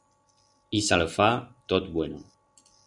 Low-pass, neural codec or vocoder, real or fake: 10.8 kHz; none; real